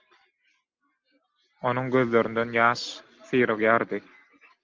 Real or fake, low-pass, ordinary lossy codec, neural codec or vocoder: real; 7.2 kHz; Opus, 32 kbps; none